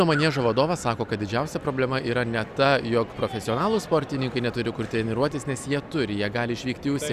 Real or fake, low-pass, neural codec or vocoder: real; 14.4 kHz; none